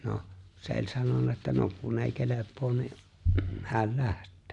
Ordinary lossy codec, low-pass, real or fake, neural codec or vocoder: none; 10.8 kHz; real; none